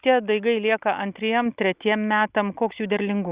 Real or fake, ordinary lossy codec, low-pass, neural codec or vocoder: real; Opus, 64 kbps; 3.6 kHz; none